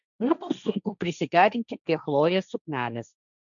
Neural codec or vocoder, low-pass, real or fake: codec, 16 kHz, 1.1 kbps, Voila-Tokenizer; 7.2 kHz; fake